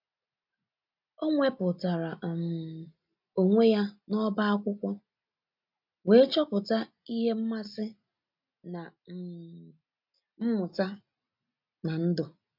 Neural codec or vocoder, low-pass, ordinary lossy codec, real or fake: none; 5.4 kHz; MP3, 48 kbps; real